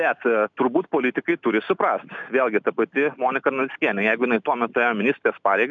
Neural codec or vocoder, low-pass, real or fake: none; 7.2 kHz; real